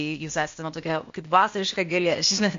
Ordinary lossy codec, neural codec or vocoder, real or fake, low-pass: MP3, 48 kbps; codec, 16 kHz, 0.8 kbps, ZipCodec; fake; 7.2 kHz